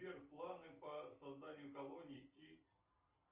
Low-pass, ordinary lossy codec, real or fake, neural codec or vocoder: 3.6 kHz; Opus, 32 kbps; real; none